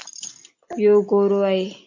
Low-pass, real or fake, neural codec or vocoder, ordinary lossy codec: 7.2 kHz; real; none; AAC, 48 kbps